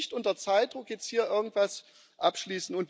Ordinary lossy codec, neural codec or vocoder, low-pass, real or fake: none; none; none; real